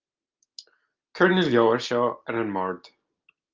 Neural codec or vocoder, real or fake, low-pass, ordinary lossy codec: none; real; 7.2 kHz; Opus, 24 kbps